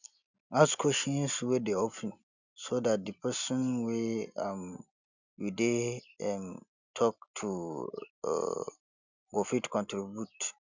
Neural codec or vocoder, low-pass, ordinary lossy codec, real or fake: none; 7.2 kHz; none; real